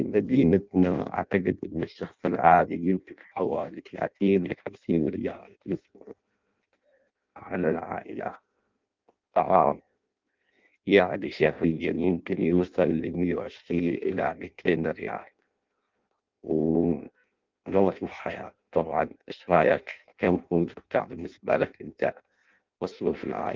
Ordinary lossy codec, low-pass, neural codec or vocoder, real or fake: Opus, 32 kbps; 7.2 kHz; codec, 16 kHz in and 24 kHz out, 0.6 kbps, FireRedTTS-2 codec; fake